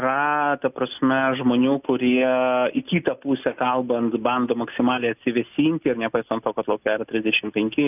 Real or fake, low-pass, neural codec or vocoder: real; 3.6 kHz; none